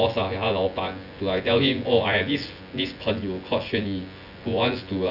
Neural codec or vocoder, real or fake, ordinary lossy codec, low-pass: vocoder, 24 kHz, 100 mel bands, Vocos; fake; none; 5.4 kHz